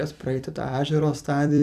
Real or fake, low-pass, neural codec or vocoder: fake; 14.4 kHz; vocoder, 44.1 kHz, 128 mel bands every 256 samples, BigVGAN v2